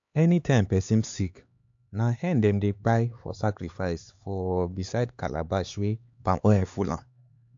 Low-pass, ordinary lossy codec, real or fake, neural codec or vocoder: 7.2 kHz; AAC, 64 kbps; fake; codec, 16 kHz, 4 kbps, X-Codec, HuBERT features, trained on LibriSpeech